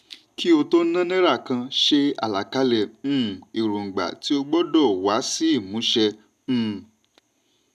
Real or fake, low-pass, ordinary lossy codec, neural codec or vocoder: real; 14.4 kHz; none; none